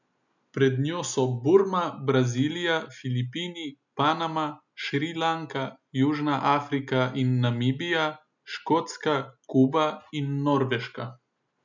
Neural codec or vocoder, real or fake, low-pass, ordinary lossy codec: none; real; 7.2 kHz; none